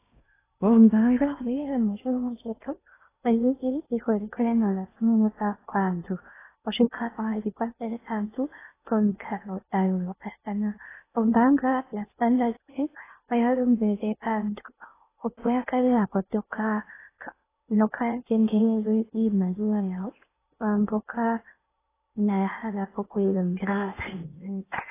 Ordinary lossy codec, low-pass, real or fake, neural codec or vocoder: AAC, 16 kbps; 3.6 kHz; fake; codec, 16 kHz in and 24 kHz out, 0.6 kbps, FocalCodec, streaming, 2048 codes